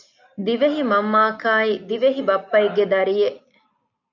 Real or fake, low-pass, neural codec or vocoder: real; 7.2 kHz; none